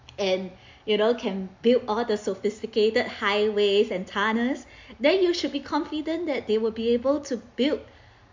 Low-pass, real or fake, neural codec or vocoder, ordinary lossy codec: 7.2 kHz; real; none; MP3, 48 kbps